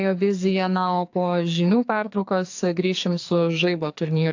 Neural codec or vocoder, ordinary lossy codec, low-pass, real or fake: codec, 44.1 kHz, 2.6 kbps, SNAC; AAC, 48 kbps; 7.2 kHz; fake